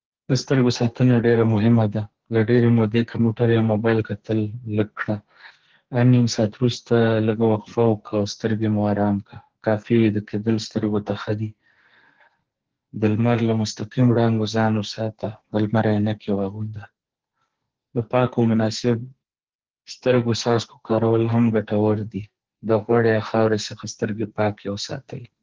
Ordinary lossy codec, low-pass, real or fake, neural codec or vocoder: Opus, 16 kbps; 7.2 kHz; fake; codec, 44.1 kHz, 2.6 kbps, SNAC